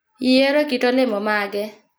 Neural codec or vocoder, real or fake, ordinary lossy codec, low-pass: none; real; none; none